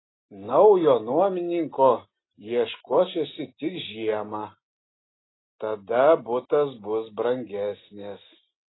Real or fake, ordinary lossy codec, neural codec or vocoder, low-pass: real; AAC, 16 kbps; none; 7.2 kHz